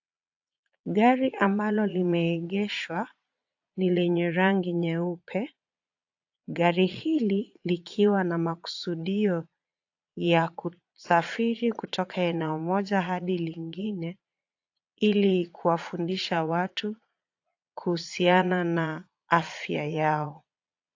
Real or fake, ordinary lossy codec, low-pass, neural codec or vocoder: fake; AAC, 48 kbps; 7.2 kHz; vocoder, 22.05 kHz, 80 mel bands, Vocos